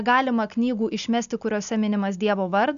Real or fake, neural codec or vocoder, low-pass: real; none; 7.2 kHz